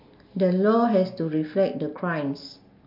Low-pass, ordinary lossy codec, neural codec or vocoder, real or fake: 5.4 kHz; MP3, 48 kbps; none; real